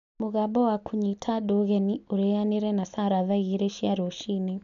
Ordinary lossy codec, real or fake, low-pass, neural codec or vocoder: none; real; 7.2 kHz; none